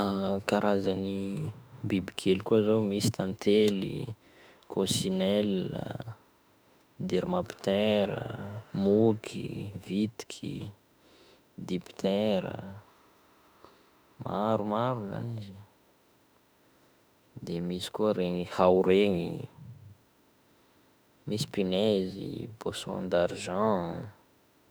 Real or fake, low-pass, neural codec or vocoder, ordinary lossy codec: fake; none; autoencoder, 48 kHz, 32 numbers a frame, DAC-VAE, trained on Japanese speech; none